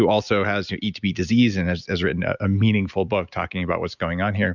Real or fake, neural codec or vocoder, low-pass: real; none; 7.2 kHz